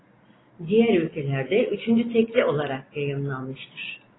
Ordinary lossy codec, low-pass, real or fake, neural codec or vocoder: AAC, 16 kbps; 7.2 kHz; real; none